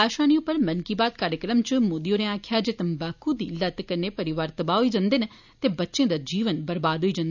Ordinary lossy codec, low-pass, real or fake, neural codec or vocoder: none; 7.2 kHz; real; none